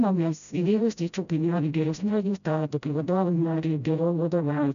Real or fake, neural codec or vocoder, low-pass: fake; codec, 16 kHz, 0.5 kbps, FreqCodec, smaller model; 7.2 kHz